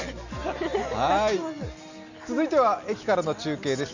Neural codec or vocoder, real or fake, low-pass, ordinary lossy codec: none; real; 7.2 kHz; none